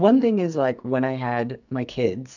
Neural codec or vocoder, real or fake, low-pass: codec, 44.1 kHz, 2.6 kbps, SNAC; fake; 7.2 kHz